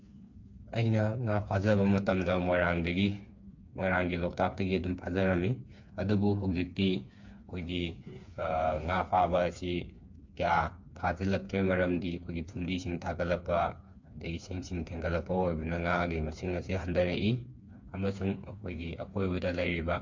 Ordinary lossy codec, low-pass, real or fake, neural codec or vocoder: MP3, 48 kbps; 7.2 kHz; fake; codec, 16 kHz, 4 kbps, FreqCodec, smaller model